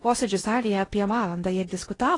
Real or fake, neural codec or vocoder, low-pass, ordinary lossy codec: fake; codec, 16 kHz in and 24 kHz out, 0.8 kbps, FocalCodec, streaming, 65536 codes; 10.8 kHz; AAC, 32 kbps